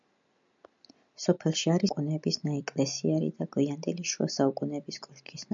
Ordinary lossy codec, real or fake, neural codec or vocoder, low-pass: MP3, 64 kbps; real; none; 7.2 kHz